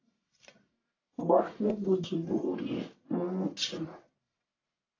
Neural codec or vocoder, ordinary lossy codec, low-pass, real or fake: codec, 44.1 kHz, 1.7 kbps, Pupu-Codec; AAC, 32 kbps; 7.2 kHz; fake